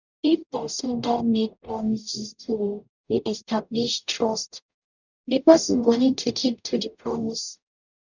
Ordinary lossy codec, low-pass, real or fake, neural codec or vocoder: none; 7.2 kHz; fake; codec, 44.1 kHz, 0.9 kbps, DAC